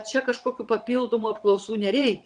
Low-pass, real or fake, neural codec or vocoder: 9.9 kHz; fake; vocoder, 22.05 kHz, 80 mel bands, WaveNeXt